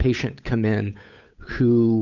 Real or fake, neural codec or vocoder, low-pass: fake; codec, 16 kHz, 8 kbps, FunCodec, trained on Chinese and English, 25 frames a second; 7.2 kHz